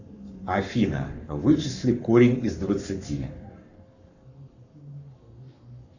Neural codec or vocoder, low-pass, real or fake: codec, 44.1 kHz, 7.8 kbps, Pupu-Codec; 7.2 kHz; fake